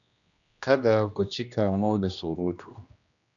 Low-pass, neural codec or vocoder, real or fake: 7.2 kHz; codec, 16 kHz, 1 kbps, X-Codec, HuBERT features, trained on general audio; fake